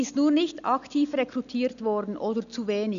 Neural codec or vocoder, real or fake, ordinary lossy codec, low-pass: none; real; none; 7.2 kHz